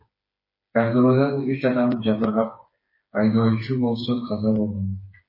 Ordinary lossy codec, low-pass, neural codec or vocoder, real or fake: MP3, 24 kbps; 5.4 kHz; codec, 16 kHz, 4 kbps, FreqCodec, smaller model; fake